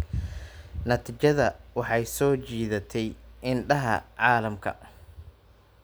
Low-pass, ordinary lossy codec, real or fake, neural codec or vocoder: none; none; real; none